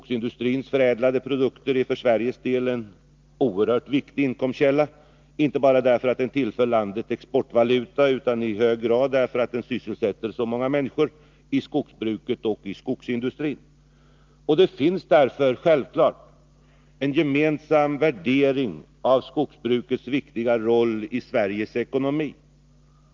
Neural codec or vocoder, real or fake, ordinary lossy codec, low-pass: none; real; Opus, 24 kbps; 7.2 kHz